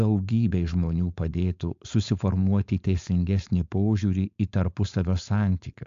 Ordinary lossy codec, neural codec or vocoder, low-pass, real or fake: AAC, 64 kbps; codec, 16 kHz, 4.8 kbps, FACodec; 7.2 kHz; fake